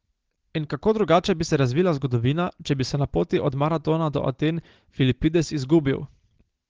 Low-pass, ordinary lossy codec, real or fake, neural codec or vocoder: 7.2 kHz; Opus, 16 kbps; real; none